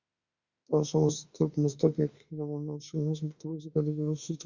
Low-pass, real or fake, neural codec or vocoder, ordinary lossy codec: 7.2 kHz; fake; autoencoder, 48 kHz, 32 numbers a frame, DAC-VAE, trained on Japanese speech; Opus, 64 kbps